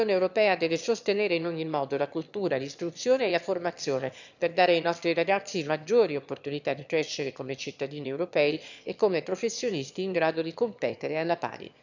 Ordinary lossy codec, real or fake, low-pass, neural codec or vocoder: none; fake; 7.2 kHz; autoencoder, 22.05 kHz, a latent of 192 numbers a frame, VITS, trained on one speaker